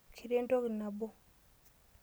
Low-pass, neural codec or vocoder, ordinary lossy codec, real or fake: none; none; none; real